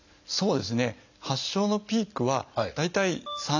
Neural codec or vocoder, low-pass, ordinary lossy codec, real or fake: none; 7.2 kHz; none; real